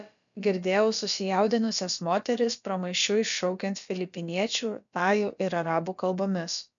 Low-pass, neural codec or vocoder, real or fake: 7.2 kHz; codec, 16 kHz, about 1 kbps, DyCAST, with the encoder's durations; fake